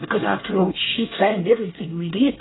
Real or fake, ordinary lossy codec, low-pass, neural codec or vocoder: fake; AAC, 16 kbps; 7.2 kHz; codec, 24 kHz, 1 kbps, SNAC